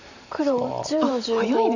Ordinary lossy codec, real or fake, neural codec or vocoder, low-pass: none; fake; vocoder, 44.1 kHz, 128 mel bands every 512 samples, BigVGAN v2; 7.2 kHz